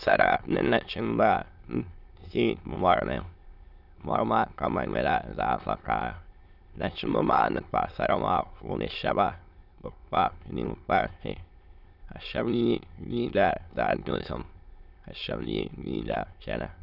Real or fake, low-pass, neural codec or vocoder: fake; 5.4 kHz; autoencoder, 22.05 kHz, a latent of 192 numbers a frame, VITS, trained on many speakers